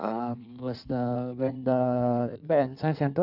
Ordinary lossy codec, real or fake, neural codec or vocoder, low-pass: none; fake; codec, 16 kHz in and 24 kHz out, 1.1 kbps, FireRedTTS-2 codec; 5.4 kHz